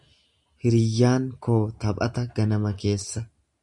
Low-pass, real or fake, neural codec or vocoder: 10.8 kHz; real; none